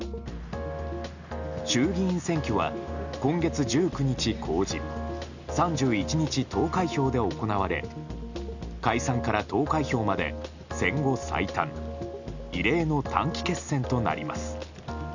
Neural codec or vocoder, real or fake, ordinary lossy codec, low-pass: none; real; none; 7.2 kHz